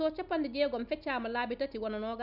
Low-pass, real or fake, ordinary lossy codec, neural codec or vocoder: 5.4 kHz; real; none; none